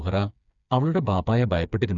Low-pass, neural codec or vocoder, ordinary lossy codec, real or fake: 7.2 kHz; codec, 16 kHz, 4 kbps, FreqCodec, smaller model; none; fake